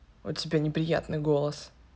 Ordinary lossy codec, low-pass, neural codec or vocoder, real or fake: none; none; none; real